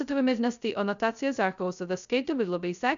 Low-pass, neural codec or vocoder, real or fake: 7.2 kHz; codec, 16 kHz, 0.2 kbps, FocalCodec; fake